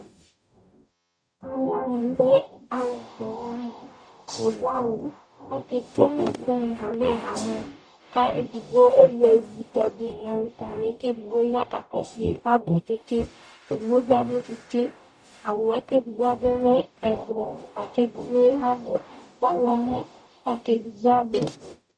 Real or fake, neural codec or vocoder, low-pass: fake; codec, 44.1 kHz, 0.9 kbps, DAC; 9.9 kHz